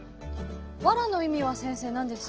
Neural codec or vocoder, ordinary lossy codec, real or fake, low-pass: none; Opus, 16 kbps; real; 7.2 kHz